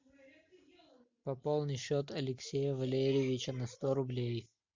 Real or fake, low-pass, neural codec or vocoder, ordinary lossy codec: real; 7.2 kHz; none; MP3, 64 kbps